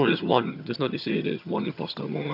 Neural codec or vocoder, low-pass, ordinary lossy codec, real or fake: vocoder, 22.05 kHz, 80 mel bands, HiFi-GAN; 5.4 kHz; none; fake